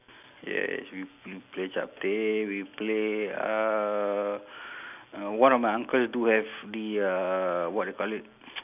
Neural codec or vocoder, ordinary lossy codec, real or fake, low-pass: none; none; real; 3.6 kHz